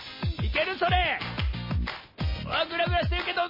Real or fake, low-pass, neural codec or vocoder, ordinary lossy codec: real; 5.4 kHz; none; MP3, 24 kbps